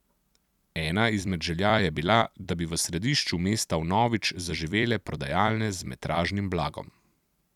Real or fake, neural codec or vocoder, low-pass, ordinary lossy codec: fake; vocoder, 44.1 kHz, 128 mel bands every 256 samples, BigVGAN v2; 19.8 kHz; none